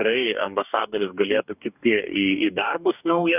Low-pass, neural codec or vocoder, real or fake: 3.6 kHz; codec, 44.1 kHz, 2.6 kbps, DAC; fake